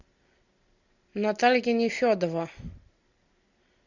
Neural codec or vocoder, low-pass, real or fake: none; 7.2 kHz; real